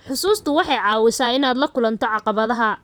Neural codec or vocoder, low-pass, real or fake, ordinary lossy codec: vocoder, 44.1 kHz, 128 mel bands, Pupu-Vocoder; none; fake; none